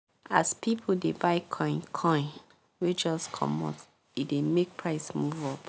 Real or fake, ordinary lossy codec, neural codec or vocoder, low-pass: real; none; none; none